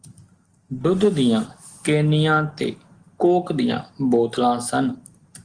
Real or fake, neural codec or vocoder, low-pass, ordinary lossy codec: real; none; 9.9 kHz; Opus, 24 kbps